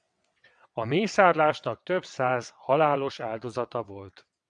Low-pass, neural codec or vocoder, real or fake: 9.9 kHz; vocoder, 22.05 kHz, 80 mel bands, WaveNeXt; fake